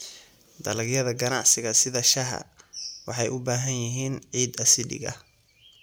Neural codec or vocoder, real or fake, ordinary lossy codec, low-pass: none; real; none; none